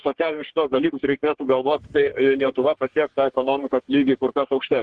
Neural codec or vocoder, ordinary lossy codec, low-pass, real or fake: codec, 44.1 kHz, 3.4 kbps, Pupu-Codec; Opus, 16 kbps; 10.8 kHz; fake